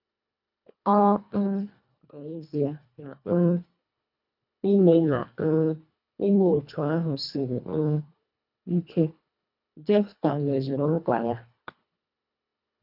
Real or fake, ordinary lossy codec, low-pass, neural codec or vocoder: fake; none; 5.4 kHz; codec, 24 kHz, 1.5 kbps, HILCodec